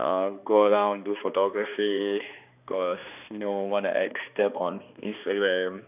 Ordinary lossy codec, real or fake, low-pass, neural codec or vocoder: none; fake; 3.6 kHz; codec, 16 kHz, 2 kbps, X-Codec, HuBERT features, trained on balanced general audio